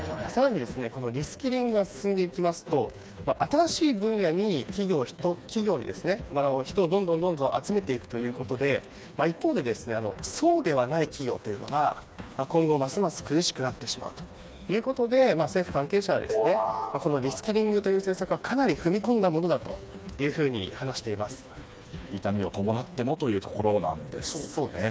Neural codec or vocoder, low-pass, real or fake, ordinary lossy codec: codec, 16 kHz, 2 kbps, FreqCodec, smaller model; none; fake; none